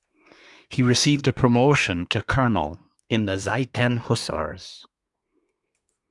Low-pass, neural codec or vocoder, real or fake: 10.8 kHz; codec, 24 kHz, 1 kbps, SNAC; fake